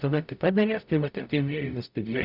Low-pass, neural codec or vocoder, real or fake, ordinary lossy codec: 5.4 kHz; codec, 44.1 kHz, 0.9 kbps, DAC; fake; Opus, 64 kbps